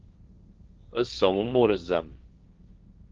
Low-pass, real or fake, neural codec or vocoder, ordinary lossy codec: 7.2 kHz; fake; codec, 16 kHz, 1.1 kbps, Voila-Tokenizer; Opus, 32 kbps